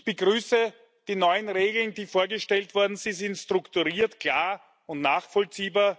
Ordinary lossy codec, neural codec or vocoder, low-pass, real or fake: none; none; none; real